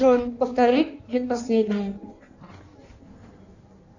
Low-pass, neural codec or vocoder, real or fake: 7.2 kHz; codec, 16 kHz in and 24 kHz out, 1.1 kbps, FireRedTTS-2 codec; fake